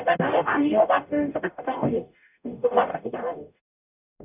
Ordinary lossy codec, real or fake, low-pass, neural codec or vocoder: none; fake; 3.6 kHz; codec, 44.1 kHz, 0.9 kbps, DAC